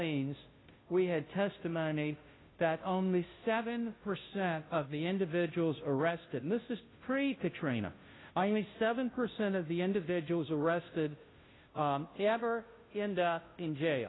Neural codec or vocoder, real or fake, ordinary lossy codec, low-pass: codec, 16 kHz, 0.5 kbps, FunCodec, trained on Chinese and English, 25 frames a second; fake; AAC, 16 kbps; 7.2 kHz